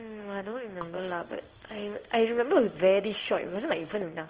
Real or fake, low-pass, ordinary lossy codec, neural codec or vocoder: fake; 3.6 kHz; Opus, 16 kbps; codec, 16 kHz in and 24 kHz out, 1 kbps, XY-Tokenizer